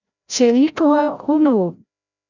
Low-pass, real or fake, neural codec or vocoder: 7.2 kHz; fake; codec, 16 kHz, 0.5 kbps, FreqCodec, larger model